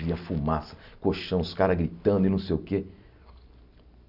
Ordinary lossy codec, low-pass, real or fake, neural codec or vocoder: none; 5.4 kHz; real; none